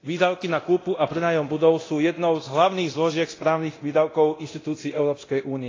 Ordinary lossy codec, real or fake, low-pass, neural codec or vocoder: AAC, 32 kbps; fake; 7.2 kHz; codec, 24 kHz, 0.9 kbps, DualCodec